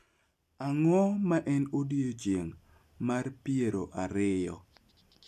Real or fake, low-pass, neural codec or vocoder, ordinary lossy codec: real; 14.4 kHz; none; none